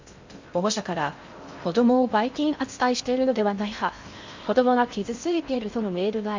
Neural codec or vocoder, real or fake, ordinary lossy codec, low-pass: codec, 16 kHz in and 24 kHz out, 0.6 kbps, FocalCodec, streaming, 2048 codes; fake; MP3, 64 kbps; 7.2 kHz